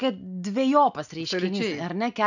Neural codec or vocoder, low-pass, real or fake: none; 7.2 kHz; real